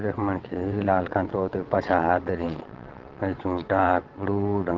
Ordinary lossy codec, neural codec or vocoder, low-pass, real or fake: Opus, 16 kbps; vocoder, 22.05 kHz, 80 mel bands, WaveNeXt; 7.2 kHz; fake